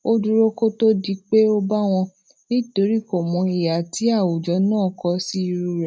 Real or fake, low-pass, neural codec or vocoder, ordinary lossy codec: real; none; none; none